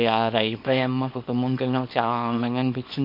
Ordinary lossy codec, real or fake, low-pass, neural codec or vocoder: MP3, 32 kbps; fake; 5.4 kHz; codec, 24 kHz, 0.9 kbps, WavTokenizer, small release